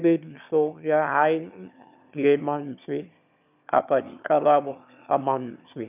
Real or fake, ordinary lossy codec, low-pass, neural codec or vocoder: fake; none; 3.6 kHz; autoencoder, 22.05 kHz, a latent of 192 numbers a frame, VITS, trained on one speaker